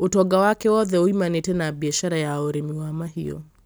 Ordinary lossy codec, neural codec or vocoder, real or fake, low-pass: none; none; real; none